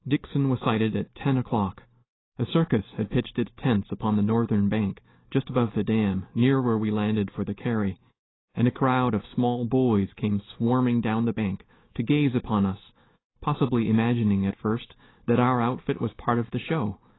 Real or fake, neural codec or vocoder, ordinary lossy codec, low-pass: real; none; AAC, 16 kbps; 7.2 kHz